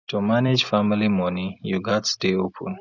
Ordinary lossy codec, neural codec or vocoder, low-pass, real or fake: none; none; 7.2 kHz; real